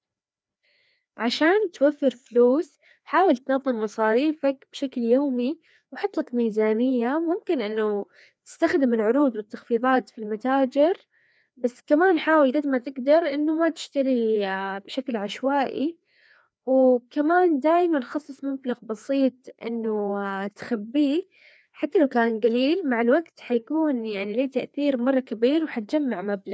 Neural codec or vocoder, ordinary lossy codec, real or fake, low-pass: codec, 16 kHz, 2 kbps, FreqCodec, larger model; none; fake; none